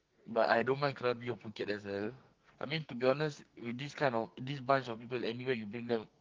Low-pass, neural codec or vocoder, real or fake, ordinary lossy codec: 7.2 kHz; codec, 44.1 kHz, 2.6 kbps, SNAC; fake; Opus, 32 kbps